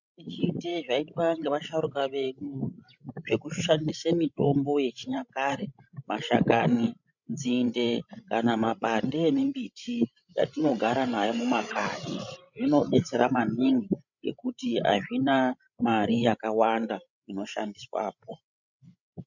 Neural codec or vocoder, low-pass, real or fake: codec, 16 kHz, 16 kbps, FreqCodec, larger model; 7.2 kHz; fake